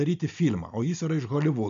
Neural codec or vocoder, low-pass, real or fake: none; 7.2 kHz; real